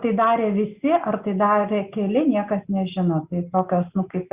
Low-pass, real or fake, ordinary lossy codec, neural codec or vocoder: 3.6 kHz; real; Opus, 64 kbps; none